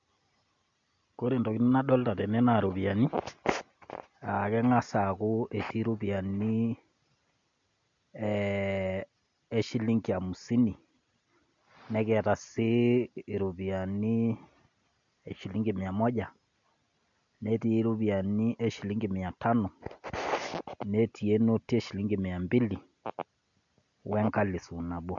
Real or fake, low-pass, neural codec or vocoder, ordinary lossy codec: real; 7.2 kHz; none; MP3, 64 kbps